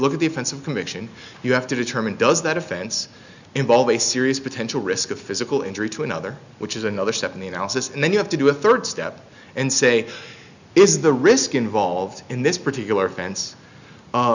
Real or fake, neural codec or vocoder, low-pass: real; none; 7.2 kHz